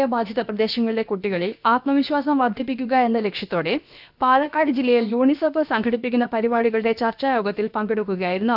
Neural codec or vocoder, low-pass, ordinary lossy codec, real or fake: codec, 16 kHz, about 1 kbps, DyCAST, with the encoder's durations; 5.4 kHz; none; fake